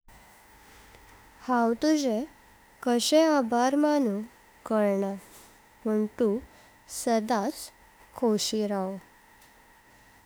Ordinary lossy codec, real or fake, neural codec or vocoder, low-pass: none; fake; autoencoder, 48 kHz, 32 numbers a frame, DAC-VAE, trained on Japanese speech; none